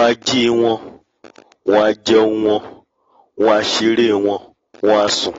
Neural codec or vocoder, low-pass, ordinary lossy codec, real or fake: none; 7.2 kHz; AAC, 24 kbps; real